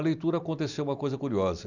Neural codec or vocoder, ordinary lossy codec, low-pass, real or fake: none; none; 7.2 kHz; real